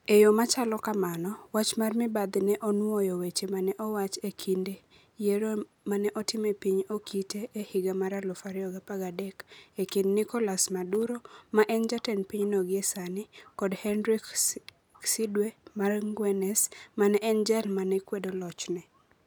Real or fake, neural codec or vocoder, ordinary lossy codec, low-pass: real; none; none; none